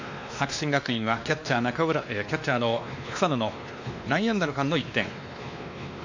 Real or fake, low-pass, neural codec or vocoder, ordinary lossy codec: fake; 7.2 kHz; codec, 16 kHz, 2 kbps, X-Codec, WavLM features, trained on Multilingual LibriSpeech; AAC, 48 kbps